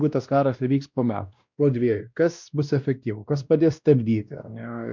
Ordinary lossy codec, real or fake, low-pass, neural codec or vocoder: MP3, 64 kbps; fake; 7.2 kHz; codec, 16 kHz, 1 kbps, X-Codec, WavLM features, trained on Multilingual LibriSpeech